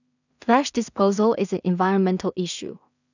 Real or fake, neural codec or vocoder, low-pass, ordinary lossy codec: fake; codec, 16 kHz in and 24 kHz out, 0.4 kbps, LongCat-Audio-Codec, two codebook decoder; 7.2 kHz; none